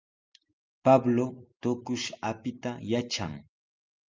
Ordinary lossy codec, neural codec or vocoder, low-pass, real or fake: Opus, 32 kbps; none; 7.2 kHz; real